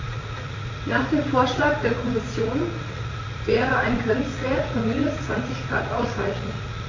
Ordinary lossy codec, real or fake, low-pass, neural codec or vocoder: AAC, 32 kbps; fake; 7.2 kHz; vocoder, 44.1 kHz, 80 mel bands, Vocos